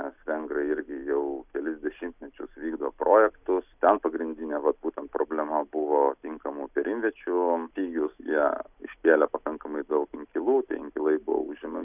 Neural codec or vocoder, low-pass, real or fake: none; 3.6 kHz; real